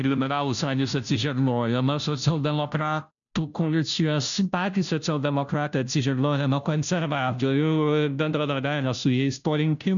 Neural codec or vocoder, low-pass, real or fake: codec, 16 kHz, 0.5 kbps, FunCodec, trained on Chinese and English, 25 frames a second; 7.2 kHz; fake